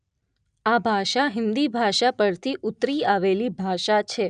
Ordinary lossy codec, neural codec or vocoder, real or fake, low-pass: none; none; real; 9.9 kHz